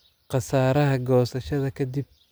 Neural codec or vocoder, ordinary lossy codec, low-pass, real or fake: none; none; none; real